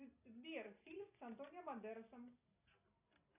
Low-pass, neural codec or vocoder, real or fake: 3.6 kHz; none; real